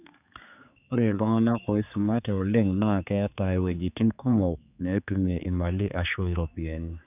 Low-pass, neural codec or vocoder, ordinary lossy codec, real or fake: 3.6 kHz; codec, 16 kHz, 4 kbps, X-Codec, HuBERT features, trained on general audio; none; fake